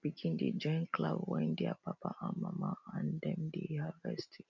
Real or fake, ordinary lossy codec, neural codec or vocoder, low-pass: real; none; none; 7.2 kHz